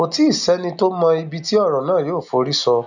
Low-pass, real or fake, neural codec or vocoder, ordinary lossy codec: 7.2 kHz; real; none; none